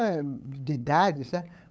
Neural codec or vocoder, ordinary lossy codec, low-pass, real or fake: codec, 16 kHz, 8 kbps, FunCodec, trained on LibriTTS, 25 frames a second; none; none; fake